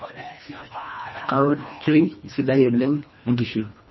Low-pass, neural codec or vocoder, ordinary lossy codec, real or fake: 7.2 kHz; codec, 24 kHz, 1.5 kbps, HILCodec; MP3, 24 kbps; fake